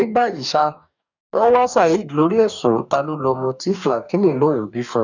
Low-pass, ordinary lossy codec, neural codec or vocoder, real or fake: 7.2 kHz; none; codec, 44.1 kHz, 2.6 kbps, DAC; fake